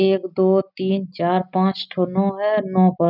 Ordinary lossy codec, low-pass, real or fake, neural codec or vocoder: none; 5.4 kHz; real; none